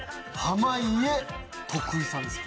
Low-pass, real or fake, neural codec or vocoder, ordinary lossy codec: none; real; none; none